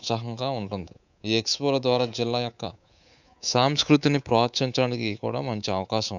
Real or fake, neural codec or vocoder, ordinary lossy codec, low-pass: fake; codec, 24 kHz, 3.1 kbps, DualCodec; none; 7.2 kHz